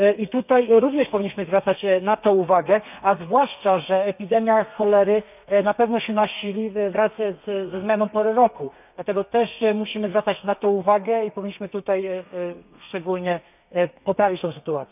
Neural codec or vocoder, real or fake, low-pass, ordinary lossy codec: codec, 32 kHz, 1.9 kbps, SNAC; fake; 3.6 kHz; none